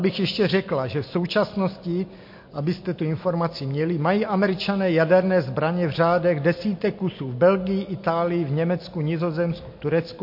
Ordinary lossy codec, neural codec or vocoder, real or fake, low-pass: MP3, 32 kbps; none; real; 5.4 kHz